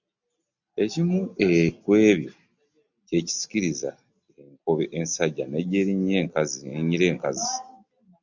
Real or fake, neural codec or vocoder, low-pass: real; none; 7.2 kHz